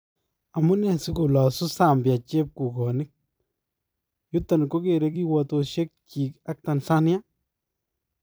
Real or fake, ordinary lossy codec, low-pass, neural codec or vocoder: fake; none; none; vocoder, 44.1 kHz, 128 mel bands every 256 samples, BigVGAN v2